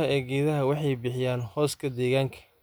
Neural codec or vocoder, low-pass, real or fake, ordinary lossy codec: none; none; real; none